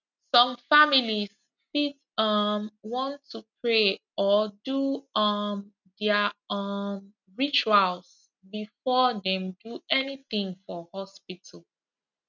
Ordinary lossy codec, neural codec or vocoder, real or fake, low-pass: none; none; real; 7.2 kHz